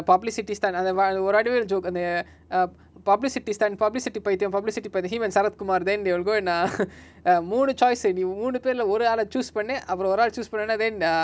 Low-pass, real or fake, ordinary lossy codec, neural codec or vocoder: none; real; none; none